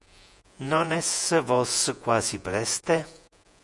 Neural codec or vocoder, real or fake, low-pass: vocoder, 48 kHz, 128 mel bands, Vocos; fake; 10.8 kHz